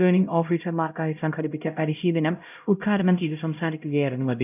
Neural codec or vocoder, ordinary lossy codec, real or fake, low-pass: codec, 16 kHz, 0.5 kbps, X-Codec, HuBERT features, trained on LibriSpeech; none; fake; 3.6 kHz